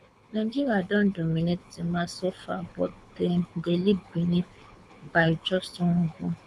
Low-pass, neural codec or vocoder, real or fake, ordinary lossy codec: none; codec, 24 kHz, 6 kbps, HILCodec; fake; none